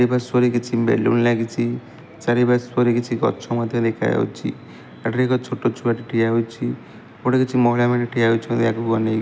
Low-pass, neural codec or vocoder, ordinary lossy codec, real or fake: none; none; none; real